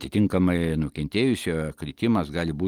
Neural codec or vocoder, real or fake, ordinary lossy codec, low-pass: none; real; Opus, 32 kbps; 19.8 kHz